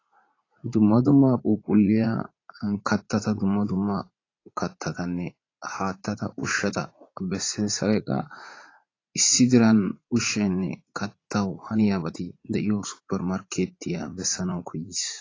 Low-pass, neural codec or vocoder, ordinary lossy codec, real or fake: 7.2 kHz; vocoder, 44.1 kHz, 80 mel bands, Vocos; AAC, 32 kbps; fake